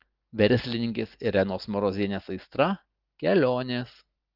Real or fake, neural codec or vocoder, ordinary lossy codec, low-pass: real; none; Opus, 32 kbps; 5.4 kHz